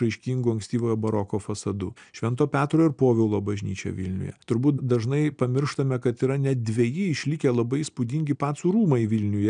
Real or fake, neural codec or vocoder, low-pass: real; none; 9.9 kHz